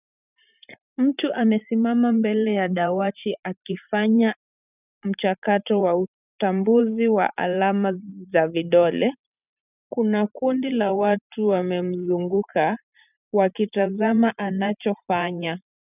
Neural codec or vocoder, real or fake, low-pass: vocoder, 44.1 kHz, 128 mel bands every 512 samples, BigVGAN v2; fake; 3.6 kHz